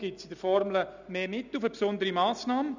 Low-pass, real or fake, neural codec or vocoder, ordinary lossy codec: 7.2 kHz; real; none; none